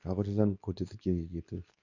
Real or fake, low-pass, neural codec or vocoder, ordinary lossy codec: fake; 7.2 kHz; codec, 24 kHz, 0.9 kbps, WavTokenizer, medium speech release version 2; none